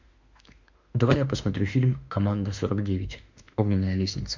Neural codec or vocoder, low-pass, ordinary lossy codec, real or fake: autoencoder, 48 kHz, 32 numbers a frame, DAC-VAE, trained on Japanese speech; 7.2 kHz; MP3, 64 kbps; fake